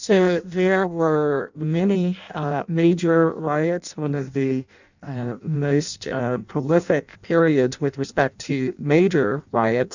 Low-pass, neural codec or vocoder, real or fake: 7.2 kHz; codec, 16 kHz in and 24 kHz out, 0.6 kbps, FireRedTTS-2 codec; fake